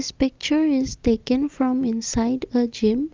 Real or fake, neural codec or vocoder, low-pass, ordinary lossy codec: real; none; 7.2 kHz; Opus, 32 kbps